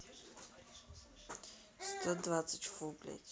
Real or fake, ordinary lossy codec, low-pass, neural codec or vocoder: real; none; none; none